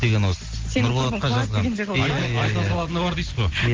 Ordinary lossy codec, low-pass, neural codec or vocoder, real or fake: Opus, 16 kbps; 7.2 kHz; none; real